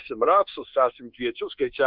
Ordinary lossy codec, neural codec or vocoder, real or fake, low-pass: Opus, 32 kbps; codec, 24 kHz, 1.2 kbps, DualCodec; fake; 5.4 kHz